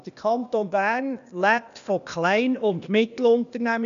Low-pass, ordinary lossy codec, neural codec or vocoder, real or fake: 7.2 kHz; MP3, 96 kbps; codec, 16 kHz, 0.8 kbps, ZipCodec; fake